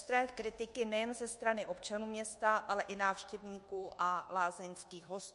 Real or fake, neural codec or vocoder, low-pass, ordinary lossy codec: fake; codec, 24 kHz, 1.2 kbps, DualCodec; 10.8 kHz; MP3, 48 kbps